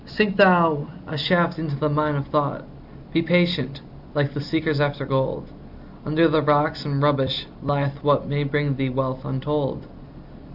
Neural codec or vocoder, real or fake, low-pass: none; real; 5.4 kHz